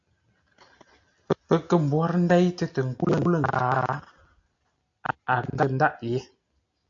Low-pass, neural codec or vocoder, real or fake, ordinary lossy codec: 7.2 kHz; none; real; AAC, 64 kbps